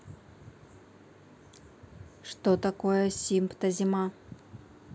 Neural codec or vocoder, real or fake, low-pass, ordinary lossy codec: none; real; none; none